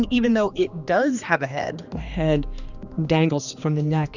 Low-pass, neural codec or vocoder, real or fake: 7.2 kHz; codec, 16 kHz, 2 kbps, X-Codec, HuBERT features, trained on general audio; fake